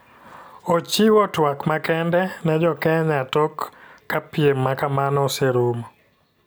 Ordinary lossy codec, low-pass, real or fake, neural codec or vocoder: none; none; real; none